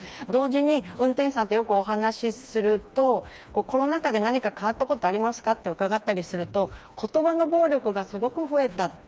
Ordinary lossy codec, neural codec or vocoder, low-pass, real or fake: none; codec, 16 kHz, 2 kbps, FreqCodec, smaller model; none; fake